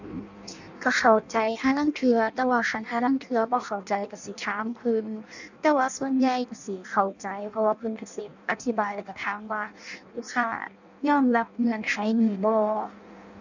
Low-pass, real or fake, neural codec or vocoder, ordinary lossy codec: 7.2 kHz; fake; codec, 16 kHz in and 24 kHz out, 0.6 kbps, FireRedTTS-2 codec; none